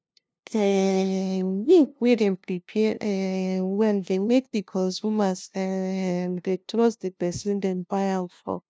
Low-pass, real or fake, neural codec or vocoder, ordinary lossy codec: none; fake; codec, 16 kHz, 0.5 kbps, FunCodec, trained on LibriTTS, 25 frames a second; none